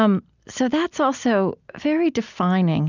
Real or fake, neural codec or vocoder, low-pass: real; none; 7.2 kHz